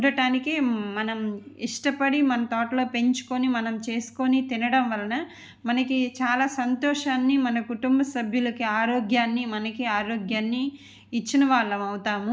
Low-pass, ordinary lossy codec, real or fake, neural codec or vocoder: none; none; real; none